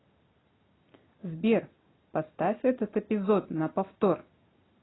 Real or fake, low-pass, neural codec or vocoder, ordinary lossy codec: real; 7.2 kHz; none; AAC, 16 kbps